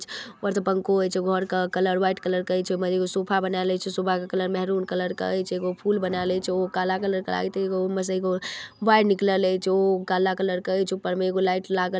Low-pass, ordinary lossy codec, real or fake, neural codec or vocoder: none; none; real; none